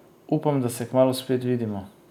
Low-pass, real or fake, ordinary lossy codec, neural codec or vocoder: 19.8 kHz; real; none; none